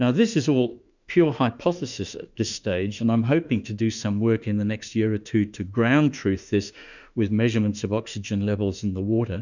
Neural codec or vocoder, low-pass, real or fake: autoencoder, 48 kHz, 32 numbers a frame, DAC-VAE, trained on Japanese speech; 7.2 kHz; fake